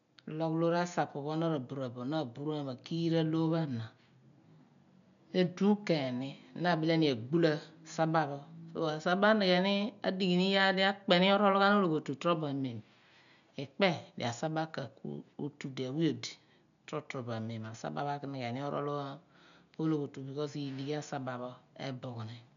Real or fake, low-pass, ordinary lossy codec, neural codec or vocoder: real; 7.2 kHz; none; none